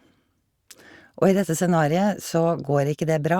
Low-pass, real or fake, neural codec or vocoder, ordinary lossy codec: 19.8 kHz; real; none; Opus, 64 kbps